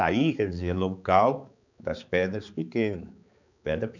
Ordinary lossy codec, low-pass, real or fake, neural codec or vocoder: none; 7.2 kHz; fake; codec, 16 kHz, 4 kbps, X-Codec, HuBERT features, trained on balanced general audio